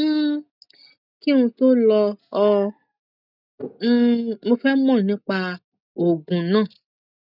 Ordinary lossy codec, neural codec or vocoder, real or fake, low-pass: none; none; real; 5.4 kHz